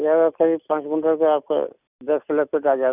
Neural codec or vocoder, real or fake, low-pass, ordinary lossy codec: none; real; 3.6 kHz; none